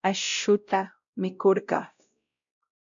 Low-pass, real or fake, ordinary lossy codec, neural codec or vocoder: 7.2 kHz; fake; MP3, 64 kbps; codec, 16 kHz, 0.5 kbps, X-Codec, WavLM features, trained on Multilingual LibriSpeech